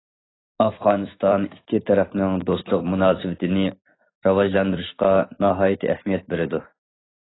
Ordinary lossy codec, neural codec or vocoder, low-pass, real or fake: AAC, 16 kbps; vocoder, 44.1 kHz, 128 mel bands every 256 samples, BigVGAN v2; 7.2 kHz; fake